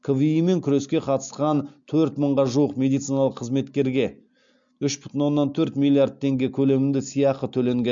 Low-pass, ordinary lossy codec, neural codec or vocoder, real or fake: 7.2 kHz; MP3, 64 kbps; none; real